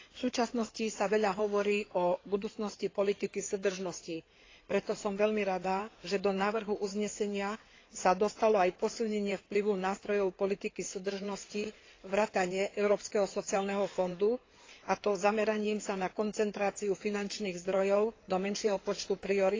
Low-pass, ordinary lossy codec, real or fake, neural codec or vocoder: 7.2 kHz; AAC, 32 kbps; fake; codec, 16 kHz in and 24 kHz out, 2.2 kbps, FireRedTTS-2 codec